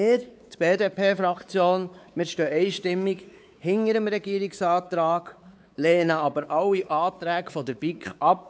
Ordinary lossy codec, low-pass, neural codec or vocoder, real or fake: none; none; codec, 16 kHz, 4 kbps, X-Codec, WavLM features, trained on Multilingual LibriSpeech; fake